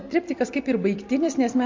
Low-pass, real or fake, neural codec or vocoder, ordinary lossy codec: 7.2 kHz; real; none; MP3, 48 kbps